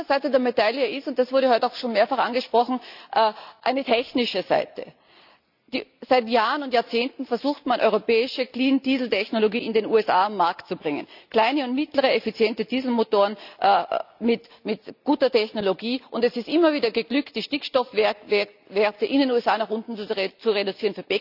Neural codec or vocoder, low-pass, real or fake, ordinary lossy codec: none; 5.4 kHz; real; none